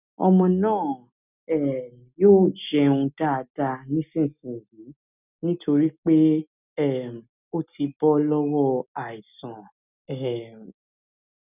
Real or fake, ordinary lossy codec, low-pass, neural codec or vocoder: real; none; 3.6 kHz; none